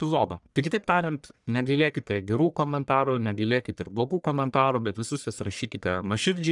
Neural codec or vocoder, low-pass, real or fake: codec, 44.1 kHz, 1.7 kbps, Pupu-Codec; 10.8 kHz; fake